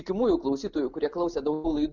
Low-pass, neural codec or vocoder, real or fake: 7.2 kHz; none; real